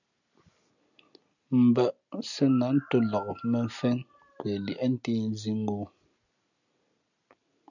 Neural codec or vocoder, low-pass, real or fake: none; 7.2 kHz; real